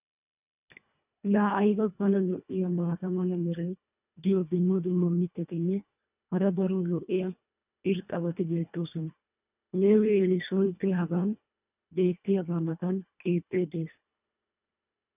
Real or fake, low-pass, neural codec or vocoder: fake; 3.6 kHz; codec, 24 kHz, 1.5 kbps, HILCodec